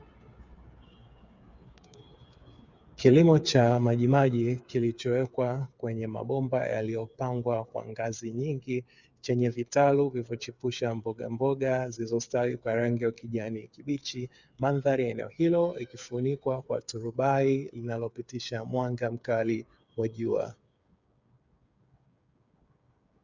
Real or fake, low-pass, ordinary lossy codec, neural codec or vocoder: fake; 7.2 kHz; Opus, 64 kbps; codec, 16 kHz, 8 kbps, FreqCodec, smaller model